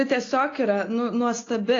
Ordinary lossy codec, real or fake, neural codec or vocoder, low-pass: AAC, 32 kbps; real; none; 7.2 kHz